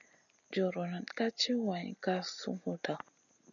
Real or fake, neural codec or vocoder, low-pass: real; none; 7.2 kHz